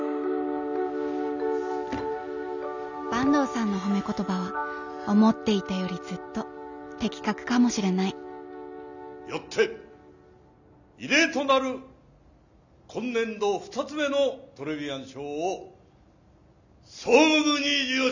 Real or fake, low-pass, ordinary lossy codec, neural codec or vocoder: real; 7.2 kHz; none; none